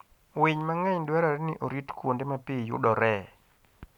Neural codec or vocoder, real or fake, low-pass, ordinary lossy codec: none; real; 19.8 kHz; none